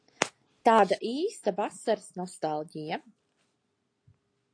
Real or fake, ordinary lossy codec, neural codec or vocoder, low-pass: real; AAC, 48 kbps; none; 9.9 kHz